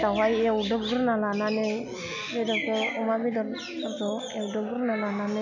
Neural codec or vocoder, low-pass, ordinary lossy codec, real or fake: none; 7.2 kHz; none; real